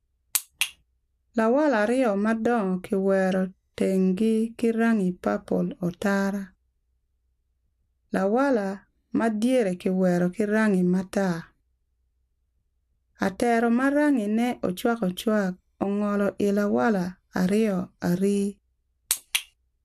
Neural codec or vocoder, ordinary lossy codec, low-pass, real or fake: none; AAC, 96 kbps; 14.4 kHz; real